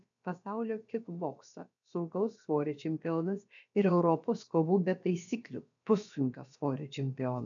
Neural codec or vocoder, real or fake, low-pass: codec, 16 kHz, about 1 kbps, DyCAST, with the encoder's durations; fake; 7.2 kHz